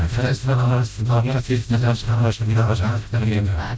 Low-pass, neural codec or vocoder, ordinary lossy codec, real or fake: none; codec, 16 kHz, 0.5 kbps, FreqCodec, smaller model; none; fake